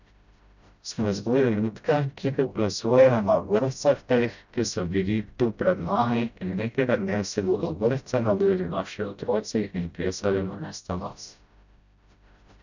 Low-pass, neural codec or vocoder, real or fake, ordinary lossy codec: 7.2 kHz; codec, 16 kHz, 0.5 kbps, FreqCodec, smaller model; fake; none